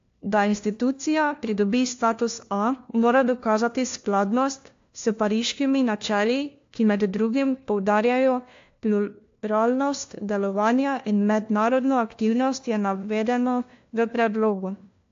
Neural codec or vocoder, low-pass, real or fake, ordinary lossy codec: codec, 16 kHz, 1 kbps, FunCodec, trained on LibriTTS, 50 frames a second; 7.2 kHz; fake; AAC, 48 kbps